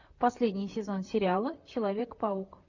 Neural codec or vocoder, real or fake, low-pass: vocoder, 44.1 kHz, 128 mel bands, Pupu-Vocoder; fake; 7.2 kHz